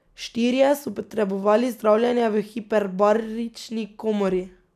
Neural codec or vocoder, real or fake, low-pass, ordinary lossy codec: none; real; 14.4 kHz; none